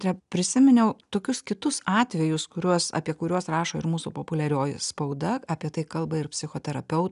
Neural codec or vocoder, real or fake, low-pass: none; real; 10.8 kHz